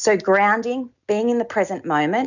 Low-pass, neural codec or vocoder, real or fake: 7.2 kHz; none; real